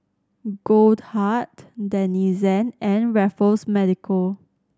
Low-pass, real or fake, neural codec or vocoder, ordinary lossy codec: none; real; none; none